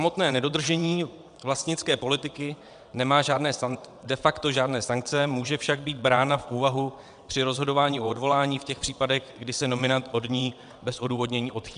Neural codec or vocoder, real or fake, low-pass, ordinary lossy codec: vocoder, 22.05 kHz, 80 mel bands, WaveNeXt; fake; 9.9 kHz; MP3, 96 kbps